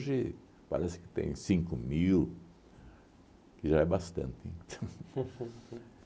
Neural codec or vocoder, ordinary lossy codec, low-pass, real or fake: none; none; none; real